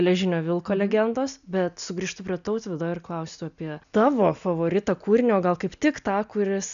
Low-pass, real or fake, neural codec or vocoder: 7.2 kHz; real; none